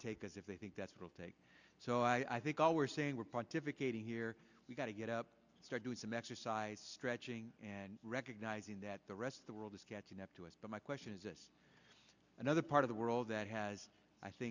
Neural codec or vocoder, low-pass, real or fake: none; 7.2 kHz; real